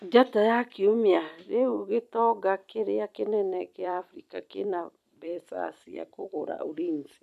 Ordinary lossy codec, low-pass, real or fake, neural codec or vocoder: none; 14.4 kHz; fake; vocoder, 44.1 kHz, 128 mel bands every 256 samples, BigVGAN v2